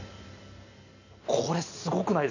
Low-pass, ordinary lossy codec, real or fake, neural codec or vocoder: 7.2 kHz; none; real; none